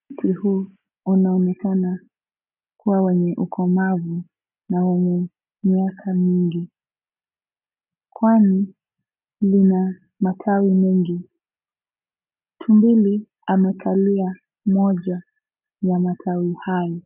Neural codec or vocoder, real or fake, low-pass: none; real; 3.6 kHz